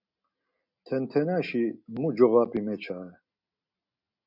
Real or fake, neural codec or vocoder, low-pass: real; none; 5.4 kHz